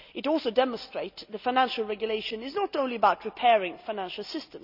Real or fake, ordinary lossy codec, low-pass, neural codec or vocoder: real; none; 5.4 kHz; none